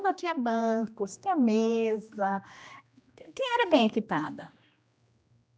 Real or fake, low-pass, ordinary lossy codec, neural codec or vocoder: fake; none; none; codec, 16 kHz, 1 kbps, X-Codec, HuBERT features, trained on general audio